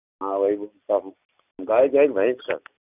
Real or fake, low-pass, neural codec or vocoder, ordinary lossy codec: real; 3.6 kHz; none; none